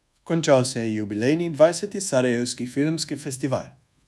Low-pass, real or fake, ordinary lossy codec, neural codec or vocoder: none; fake; none; codec, 24 kHz, 1.2 kbps, DualCodec